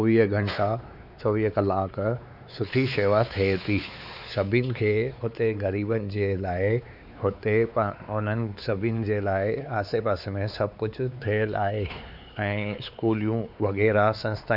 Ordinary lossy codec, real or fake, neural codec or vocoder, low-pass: none; fake; codec, 16 kHz, 4 kbps, X-Codec, WavLM features, trained on Multilingual LibriSpeech; 5.4 kHz